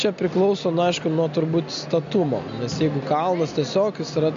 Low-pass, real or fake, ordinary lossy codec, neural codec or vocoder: 7.2 kHz; real; MP3, 48 kbps; none